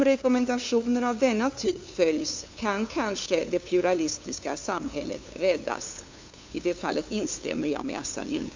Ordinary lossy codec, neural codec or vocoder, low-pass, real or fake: MP3, 64 kbps; codec, 16 kHz, 2 kbps, FunCodec, trained on LibriTTS, 25 frames a second; 7.2 kHz; fake